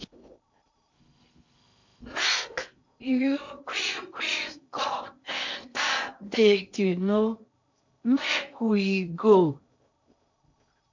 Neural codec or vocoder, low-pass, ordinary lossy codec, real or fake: codec, 16 kHz in and 24 kHz out, 0.6 kbps, FocalCodec, streaming, 2048 codes; 7.2 kHz; MP3, 48 kbps; fake